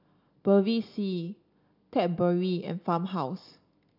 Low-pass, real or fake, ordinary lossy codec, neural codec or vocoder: 5.4 kHz; real; none; none